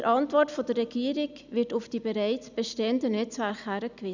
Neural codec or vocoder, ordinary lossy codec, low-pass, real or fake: none; none; 7.2 kHz; real